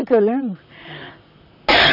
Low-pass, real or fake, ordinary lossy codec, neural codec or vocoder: 5.4 kHz; fake; none; vocoder, 44.1 kHz, 128 mel bands, Pupu-Vocoder